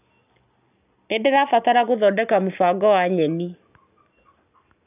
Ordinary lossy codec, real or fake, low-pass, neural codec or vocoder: none; real; 3.6 kHz; none